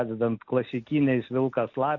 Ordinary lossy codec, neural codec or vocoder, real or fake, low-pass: AAC, 48 kbps; none; real; 7.2 kHz